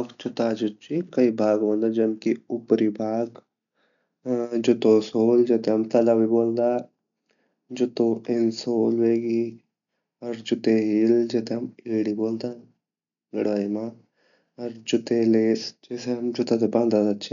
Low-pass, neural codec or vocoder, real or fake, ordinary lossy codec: 7.2 kHz; none; real; none